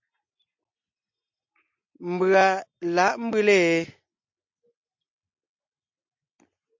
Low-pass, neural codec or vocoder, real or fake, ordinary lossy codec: 7.2 kHz; none; real; MP3, 48 kbps